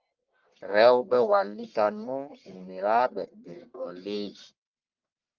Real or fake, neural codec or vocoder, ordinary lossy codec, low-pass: fake; codec, 44.1 kHz, 1.7 kbps, Pupu-Codec; Opus, 32 kbps; 7.2 kHz